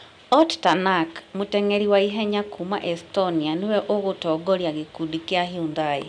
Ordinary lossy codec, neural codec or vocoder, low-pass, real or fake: none; none; 9.9 kHz; real